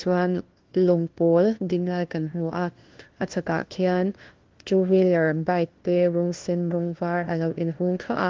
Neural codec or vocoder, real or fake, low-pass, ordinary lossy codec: codec, 16 kHz, 1 kbps, FunCodec, trained on LibriTTS, 50 frames a second; fake; 7.2 kHz; Opus, 16 kbps